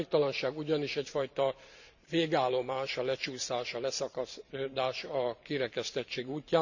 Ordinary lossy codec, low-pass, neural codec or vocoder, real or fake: none; 7.2 kHz; vocoder, 44.1 kHz, 128 mel bands every 512 samples, BigVGAN v2; fake